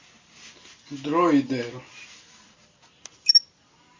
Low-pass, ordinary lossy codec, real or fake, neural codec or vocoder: 7.2 kHz; MP3, 32 kbps; real; none